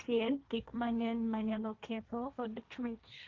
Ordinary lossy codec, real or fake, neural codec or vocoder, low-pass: Opus, 24 kbps; fake; codec, 16 kHz, 1.1 kbps, Voila-Tokenizer; 7.2 kHz